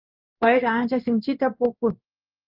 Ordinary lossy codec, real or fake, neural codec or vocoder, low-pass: Opus, 32 kbps; fake; codec, 16 kHz in and 24 kHz out, 1 kbps, XY-Tokenizer; 5.4 kHz